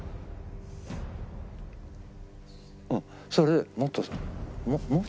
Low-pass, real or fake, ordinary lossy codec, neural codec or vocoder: none; real; none; none